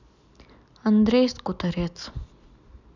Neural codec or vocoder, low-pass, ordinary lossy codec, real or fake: none; 7.2 kHz; none; real